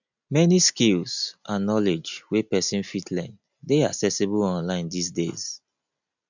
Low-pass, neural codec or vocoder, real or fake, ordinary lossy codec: 7.2 kHz; none; real; none